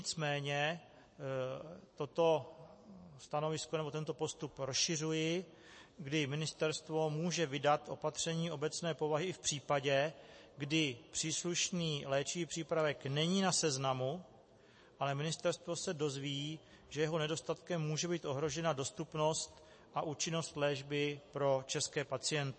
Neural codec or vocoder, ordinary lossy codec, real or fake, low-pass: none; MP3, 32 kbps; real; 9.9 kHz